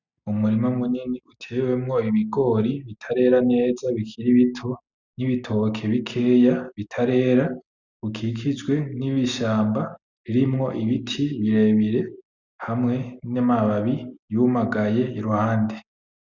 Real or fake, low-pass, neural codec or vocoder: real; 7.2 kHz; none